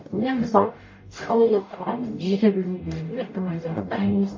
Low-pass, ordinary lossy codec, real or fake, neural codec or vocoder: 7.2 kHz; MP3, 32 kbps; fake; codec, 44.1 kHz, 0.9 kbps, DAC